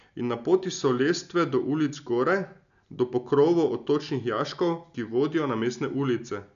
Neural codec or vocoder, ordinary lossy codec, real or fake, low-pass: none; none; real; 7.2 kHz